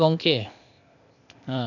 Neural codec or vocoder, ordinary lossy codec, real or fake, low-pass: codec, 16 kHz, 0.8 kbps, ZipCodec; none; fake; 7.2 kHz